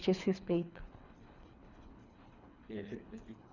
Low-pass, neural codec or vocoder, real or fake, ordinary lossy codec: 7.2 kHz; codec, 24 kHz, 3 kbps, HILCodec; fake; none